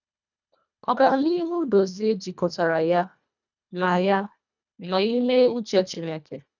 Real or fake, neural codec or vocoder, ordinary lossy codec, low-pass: fake; codec, 24 kHz, 1.5 kbps, HILCodec; none; 7.2 kHz